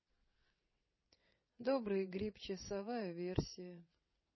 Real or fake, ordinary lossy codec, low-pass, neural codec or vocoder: fake; MP3, 24 kbps; 7.2 kHz; vocoder, 22.05 kHz, 80 mel bands, WaveNeXt